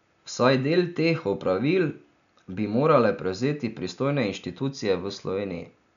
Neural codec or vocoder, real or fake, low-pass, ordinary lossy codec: none; real; 7.2 kHz; none